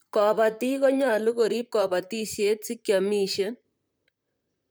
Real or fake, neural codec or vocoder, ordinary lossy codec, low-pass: fake; vocoder, 44.1 kHz, 128 mel bands, Pupu-Vocoder; none; none